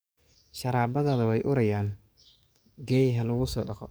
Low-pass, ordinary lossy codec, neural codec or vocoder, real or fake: none; none; codec, 44.1 kHz, 7.8 kbps, DAC; fake